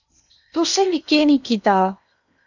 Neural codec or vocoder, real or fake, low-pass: codec, 16 kHz in and 24 kHz out, 0.8 kbps, FocalCodec, streaming, 65536 codes; fake; 7.2 kHz